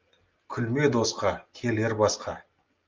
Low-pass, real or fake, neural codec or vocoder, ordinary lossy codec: 7.2 kHz; real; none; Opus, 24 kbps